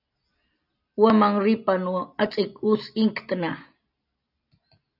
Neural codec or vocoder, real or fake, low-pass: none; real; 5.4 kHz